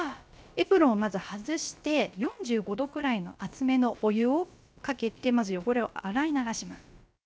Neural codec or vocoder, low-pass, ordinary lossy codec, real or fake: codec, 16 kHz, about 1 kbps, DyCAST, with the encoder's durations; none; none; fake